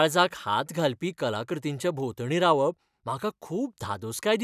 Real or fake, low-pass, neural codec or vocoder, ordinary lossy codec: real; 14.4 kHz; none; none